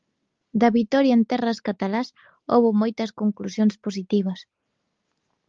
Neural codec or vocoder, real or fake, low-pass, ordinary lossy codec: none; real; 7.2 kHz; Opus, 32 kbps